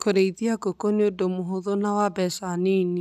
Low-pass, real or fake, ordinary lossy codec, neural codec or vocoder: 14.4 kHz; real; none; none